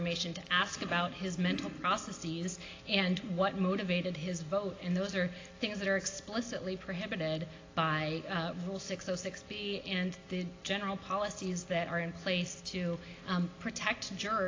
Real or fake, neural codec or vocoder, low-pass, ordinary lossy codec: real; none; 7.2 kHz; AAC, 32 kbps